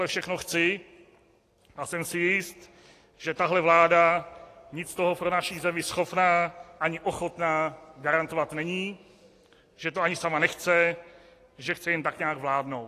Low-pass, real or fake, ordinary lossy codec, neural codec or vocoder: 14.4 kHz; real; AAC, 48 kbps; none